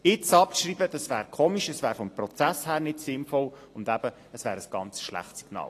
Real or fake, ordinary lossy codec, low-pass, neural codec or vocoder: real; AAC, 48 kbps; 14.4 kHz; none